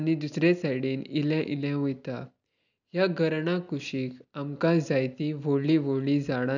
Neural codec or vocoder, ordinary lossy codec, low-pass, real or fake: none; none; 7.2 kHz; real